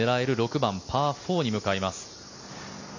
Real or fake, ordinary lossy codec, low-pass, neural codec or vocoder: real; none; 7.2 kHz; none